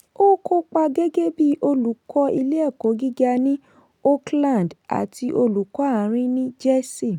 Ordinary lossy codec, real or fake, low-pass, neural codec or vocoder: none; real; 19.8 kHz; none